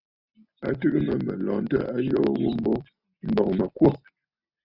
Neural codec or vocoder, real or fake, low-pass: none; real; 5.4 kHz